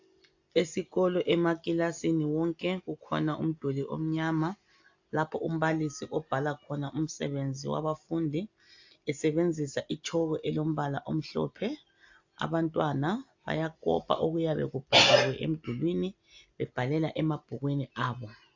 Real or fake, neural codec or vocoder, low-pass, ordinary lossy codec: real; none; 7.2 kHz; AAC, 48 kbps